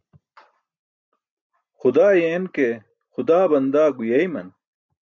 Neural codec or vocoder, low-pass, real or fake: none; 7.2 kHz; real